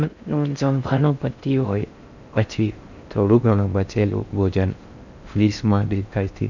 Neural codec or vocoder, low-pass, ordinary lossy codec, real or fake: codec, 16 kHz in and 24 kHz out, 0.6 kbps, FocalCodec, streaming, 4096 codes; 7.2 kHz; none; fake